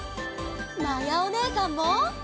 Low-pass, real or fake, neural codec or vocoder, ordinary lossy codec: none; real; none; none